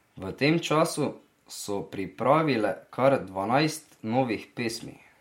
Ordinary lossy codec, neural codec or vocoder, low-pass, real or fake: MP3, 64 kbps; none; 19.8 kHz; real